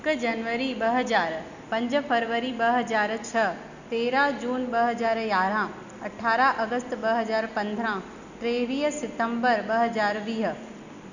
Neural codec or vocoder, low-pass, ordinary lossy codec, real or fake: none; 7.2 kHz; none; real